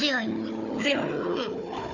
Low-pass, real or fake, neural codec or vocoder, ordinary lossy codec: 7.2 kHz; fake; codec, 16 kHz, 4 kbps, FunCodec, trained on Chinese and English, 50 frames a second; none